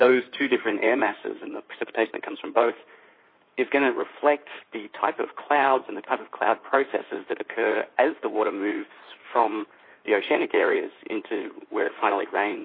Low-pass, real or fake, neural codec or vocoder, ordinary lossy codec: 5.4 kHz; fake; codec, 16 kHz in and 24 kHz out, 2.2 kbps, FireRedTTS-2 codec; MP3, 24 kbps